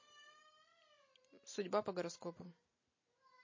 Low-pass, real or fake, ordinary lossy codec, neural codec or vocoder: 7.2 kHz; real; MP3, 32 kbps; none